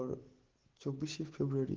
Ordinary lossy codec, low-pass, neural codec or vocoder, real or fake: Opus, 16 kbps; 7.2 kHz; none; real